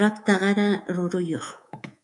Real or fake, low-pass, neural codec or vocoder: fake; 10.8 kHz; codec, 24 kHz, 3.1 kbps, DualCodec